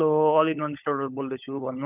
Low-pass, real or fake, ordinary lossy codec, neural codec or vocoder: 3.6 kHz; fake; none; codec, 16 kHz, 16 kbps, FunCodec, trained on LibriTTS, 50 frames a second